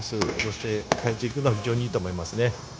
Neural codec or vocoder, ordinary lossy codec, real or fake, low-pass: codec, 16 kHz, 0.9 kbps, LongCat-Audio-Codec; none; fake; none